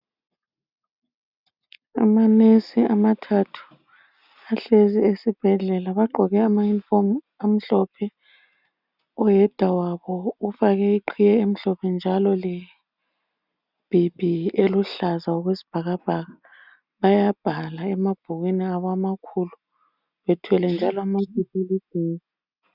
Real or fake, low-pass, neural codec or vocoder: real; 5.4 kHz; none